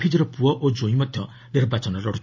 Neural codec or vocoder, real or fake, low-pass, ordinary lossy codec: none; real; 7.2 kHz; MP3, 32 kbps